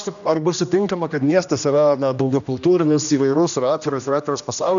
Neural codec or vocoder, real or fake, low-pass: codec, 16 kHz, 1 kbps, X-Codec, HuBERT features, trained on general audio; fake; 7.2 kHz